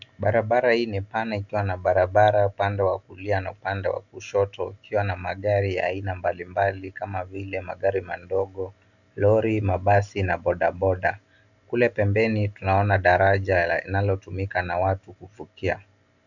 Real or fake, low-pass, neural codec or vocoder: real; 7.2 kHz; none